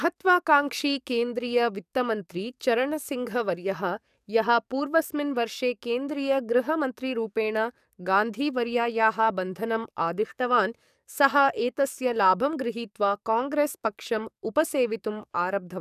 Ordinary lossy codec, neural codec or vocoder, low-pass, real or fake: none; codec, 44.1 kHz, 7.8 kbps, DAC; 14.4 kHz; fake